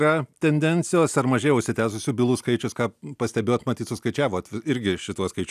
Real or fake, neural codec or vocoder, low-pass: real; none; 14.4 kHz